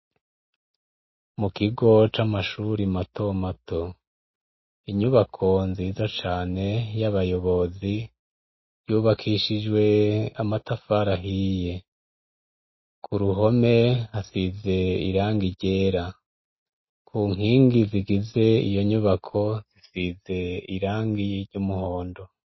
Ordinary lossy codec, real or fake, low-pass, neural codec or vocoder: MP3, 24 kbps; real; 7.2 kHz; none